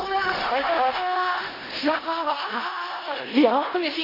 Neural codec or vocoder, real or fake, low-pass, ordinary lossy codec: codec, 16 kHz in and 24 kHz out, 0.4 kbps, LongCat-Audio-Codec, four codebook decoder; fake; 5.4 kHz; AAC, 24 kbps